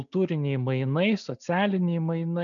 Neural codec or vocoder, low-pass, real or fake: none; 7.2 kHz; real